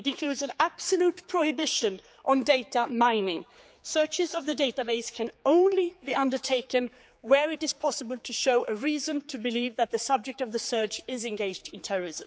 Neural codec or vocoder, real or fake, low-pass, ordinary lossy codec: codec, 16 kHz, 4 kbps, X-Codec, HuBERT features, trained on general audio; fake; none; none